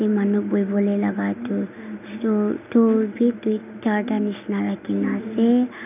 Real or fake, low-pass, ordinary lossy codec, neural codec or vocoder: real; 3.6 kHz; none; none